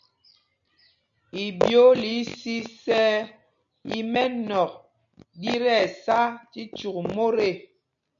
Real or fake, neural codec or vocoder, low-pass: real; none; 7.2 kHz